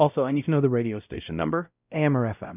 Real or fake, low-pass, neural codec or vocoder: fake; 3.6 kHz; codec, 16 kHz, 0.5 kbps, X-Codec, WavLM features, trained on Multilingual LibriSpeech